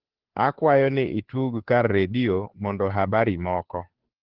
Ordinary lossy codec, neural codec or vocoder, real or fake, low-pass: Opus, 16 kbps; codec, 16 kHz, 2 kbps, FunCodec, trained on Chinese and English, 25 frames a second; fake; 5.4 kHz